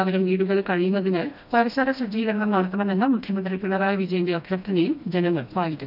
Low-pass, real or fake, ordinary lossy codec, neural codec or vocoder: 5.4 kHz; fake; none; codec, 16 kHz, 1 kbps, FreqCodec, smaller model